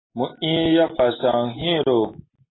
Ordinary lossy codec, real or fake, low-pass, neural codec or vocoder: AAC, 16 kbps; real; 7.2 kHz; none